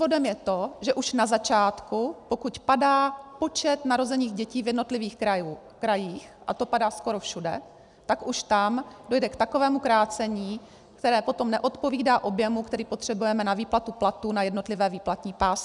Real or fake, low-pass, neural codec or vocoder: real; 10.8 kHz; none